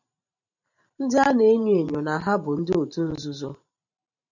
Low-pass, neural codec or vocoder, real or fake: 7.2 kHz; vocoder, 44.1 kHz, 128 mel bands every 512 samples, BigVGAN v2; fake